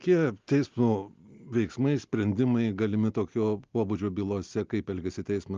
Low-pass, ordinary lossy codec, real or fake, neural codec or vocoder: 7.2 kHz; Opus, 32 kbps; real; none